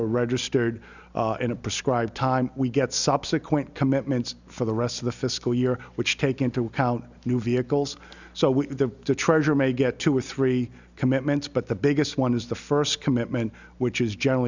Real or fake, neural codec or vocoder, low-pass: real; none; 7.2 kHz